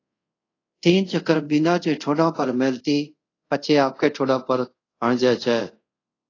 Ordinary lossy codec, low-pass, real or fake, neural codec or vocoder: AAC, 32 kbps; 7.2 kHz; fake; codec, 24 kHz, 0.5 kbps, DualCodec